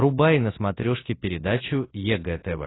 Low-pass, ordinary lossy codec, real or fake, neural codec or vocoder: 7.2 kHz; AAC, 16 kbps; real; none